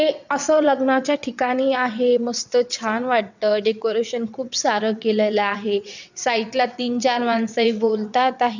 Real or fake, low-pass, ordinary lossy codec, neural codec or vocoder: fake; 7.2 kHz; none; vocoder, 22.05 kHz, 80 mel bands, WaveNeXt